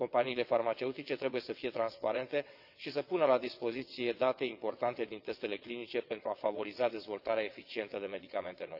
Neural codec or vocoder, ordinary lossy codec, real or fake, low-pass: vocoder, 22.05 kHz, 80 mel bands, WaveNeXt; none; fake; 5.4 kHz